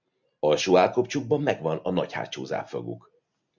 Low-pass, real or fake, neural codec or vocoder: 7.2 kHz; real; none